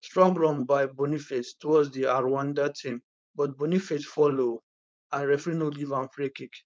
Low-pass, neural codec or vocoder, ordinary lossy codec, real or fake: none; codec, 16 kHz, 4.8 kbps, FACodec; none; fake